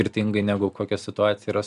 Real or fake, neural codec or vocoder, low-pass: real; none; 10.8 kHz